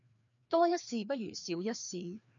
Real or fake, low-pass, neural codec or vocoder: fake; 7.2 kHz; codec, 16 kHz, 2 kbps, FreqCodec, larger model